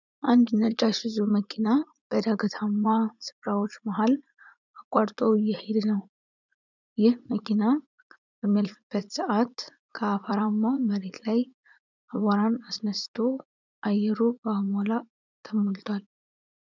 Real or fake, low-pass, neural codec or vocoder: fake; 7.2 kHz; vocoder, 44.1 kHz, 80 mel bands, Vocos